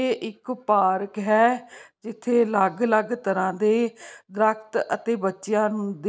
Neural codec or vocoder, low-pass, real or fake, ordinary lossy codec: none; none; real; none